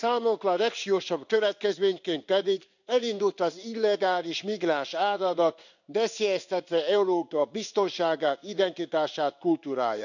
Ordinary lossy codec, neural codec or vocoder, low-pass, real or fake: none; codec, 16 kHz in and 24 kHz out, 1 kbps, XY-Tokenizer; 7.2 kHz; fake